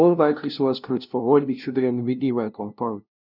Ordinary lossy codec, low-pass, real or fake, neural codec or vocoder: none; 5.4 kHz; fake; codec, 16 kHz, 0.5 kbps, FunCodec, trained on LibriTTS, 25 frames a second